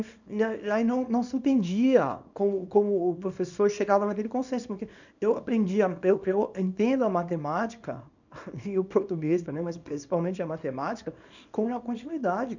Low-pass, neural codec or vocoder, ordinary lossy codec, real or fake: 7.2 kHz; codec, 24 kHz, 0.9 kbps, WavTokenizer, small release; none; fake